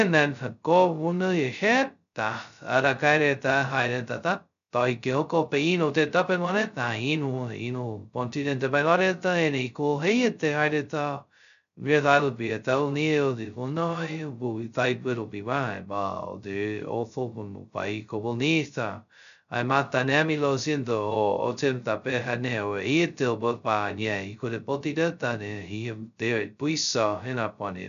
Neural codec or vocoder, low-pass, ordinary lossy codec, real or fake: codec, 16 kHz, 0.2 kbps, FocalCodec; 7.2 kHz; none; fake